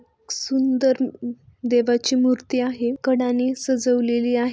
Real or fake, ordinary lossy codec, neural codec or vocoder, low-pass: real; none; none; none